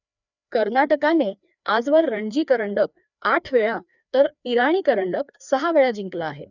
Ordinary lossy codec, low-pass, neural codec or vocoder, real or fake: none; 7.2 kHz; codec, 16 kHz, 2 kbps, FreqCodec, larger model; fake